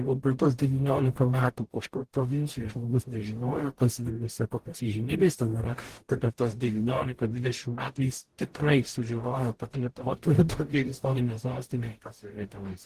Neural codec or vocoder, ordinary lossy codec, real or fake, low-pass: codec, 44.1 kHz, 0.9 kbps, DAC; Opus, 16 kbps; fake; 14.4 kHz